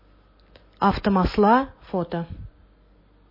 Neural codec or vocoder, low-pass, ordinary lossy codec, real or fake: none; 5.4 kHz; MP3, 24 kbps; real